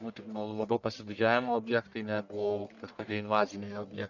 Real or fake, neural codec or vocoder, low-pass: fake; codec, 44.1 kHz, 1.7 kbps, Pupu-Codec; 7.2 kHz